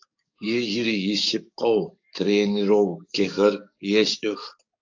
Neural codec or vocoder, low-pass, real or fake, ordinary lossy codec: codec, 44.1 kHz, 7.8 kbps, DAC; 7.2 kHz; fake; AAC, 48 kbps